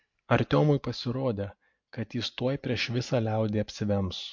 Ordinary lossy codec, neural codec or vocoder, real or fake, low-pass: MP3, 48 kbps; none; real; 7.2 kHz